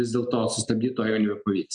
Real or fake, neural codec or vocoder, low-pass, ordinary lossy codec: real; none; 9.9 kHz; MP3, 96 kbps